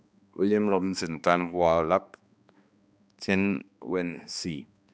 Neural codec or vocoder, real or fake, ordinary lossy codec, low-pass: codec, 16 kHz, 2 kbps, X-Codec, HuBERT features, trained on balanced general audio; fake; none; none